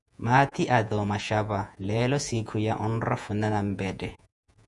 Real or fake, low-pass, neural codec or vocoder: fake; 10.8 kHz; vocoder, 48 kHz, 128 mel bands, Vocos